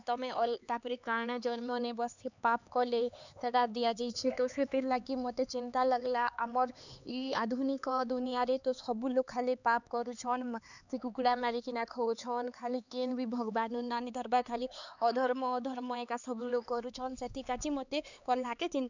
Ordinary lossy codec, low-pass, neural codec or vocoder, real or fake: none; 7.2 kHz; codec, 16 kHz, 4 kbps, X-Codec, HuBERT features, trained on LibriSpeech; fake